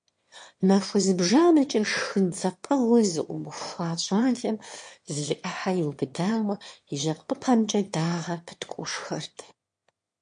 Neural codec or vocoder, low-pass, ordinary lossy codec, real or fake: autoencoder, 22.05 kHz, a latent of 192 numbers a frame, VITS, trained on one speaker; 9.9 kHz; MP3, 48 kbps; fake